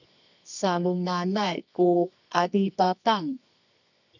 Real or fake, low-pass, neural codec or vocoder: fake; 7.2 kHz; codec, 24 kHz, 0.9 kbps, WavTokenizer, medium music audio release